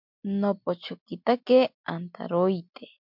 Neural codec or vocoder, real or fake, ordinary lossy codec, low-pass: none; real; MP3, 48 kbps; 5.4 kHz